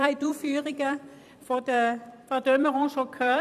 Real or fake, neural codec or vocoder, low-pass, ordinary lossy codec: fake; vocoder, 44.1 kHz, 128 mel bands every 256 samples, BigVGAN v2; 14.4 kHz; none